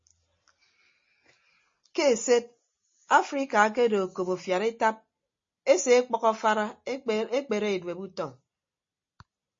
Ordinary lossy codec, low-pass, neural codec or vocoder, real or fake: MP3, 32 kbps; 7.2 kHz; none; real